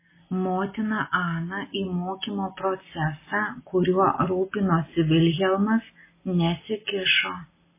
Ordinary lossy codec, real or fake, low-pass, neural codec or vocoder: MP3, 16 kbps; real; 3.6 kHz; none